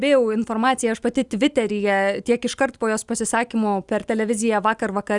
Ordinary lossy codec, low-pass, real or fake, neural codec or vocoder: Opus, 64 kbps; 10.8 kHz; real; none